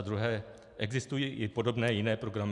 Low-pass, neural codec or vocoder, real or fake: 10.8 kHz; none; real